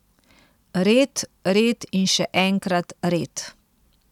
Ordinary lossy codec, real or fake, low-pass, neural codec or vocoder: none; real; 19.8 kHz; none